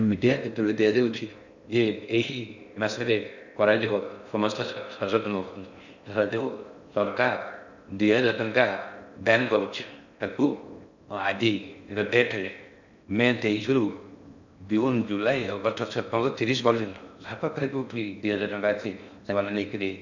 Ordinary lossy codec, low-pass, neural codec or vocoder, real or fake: none; 7.2 kHz; codec, 16 kHz in and 24 kHz out, 0.6 kbps, FocalCodec, streaming, 4096 codes; fake